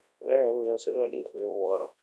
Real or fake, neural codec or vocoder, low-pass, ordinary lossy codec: fake; codec, 24 kHz, 0.9 kbps, WavTokenizer, large speech release; none; none